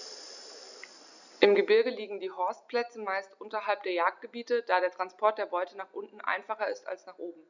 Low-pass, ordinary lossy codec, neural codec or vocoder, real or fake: 7.2 kHz; none; none; real